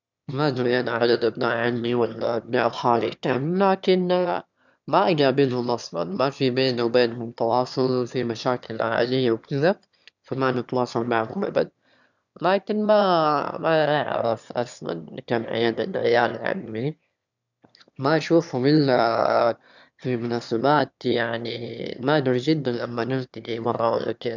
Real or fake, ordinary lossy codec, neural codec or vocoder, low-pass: fake; none; autoencoder, 22.05 kHz, a latent of 192 numbers a frame, VITS, trained on one speaker; 7.2 kHz